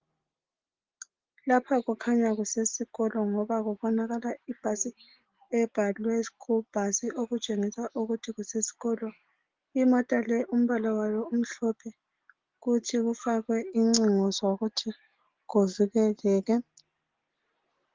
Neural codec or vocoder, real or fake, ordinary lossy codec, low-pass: none; real; Opus, 16 kbps; 7.2 kHz